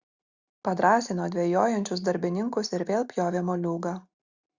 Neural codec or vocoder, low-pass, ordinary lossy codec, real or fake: none; 7.2 kHz; Opus, 64 kbps; real